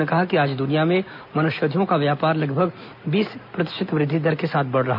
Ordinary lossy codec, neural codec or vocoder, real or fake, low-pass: none; none; real; 5.4 kHz